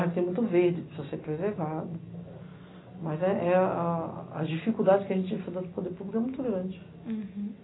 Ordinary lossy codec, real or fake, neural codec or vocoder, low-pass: AAC, 16 kbps; real; none; 7.2 kHz